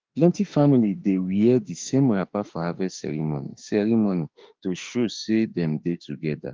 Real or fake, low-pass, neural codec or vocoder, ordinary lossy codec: fake; 7.2 kHz; autoencoder, 48 kHz, 32 numbers a frame, DAC-VAE, trained on Japanese speech; Opus, 32 kbps